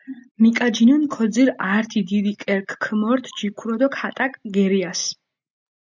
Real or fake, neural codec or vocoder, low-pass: real; none; 7.2 kHz